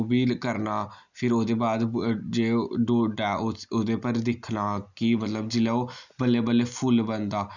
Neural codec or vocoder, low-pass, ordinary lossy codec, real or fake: none; 7.2 kHz; none; real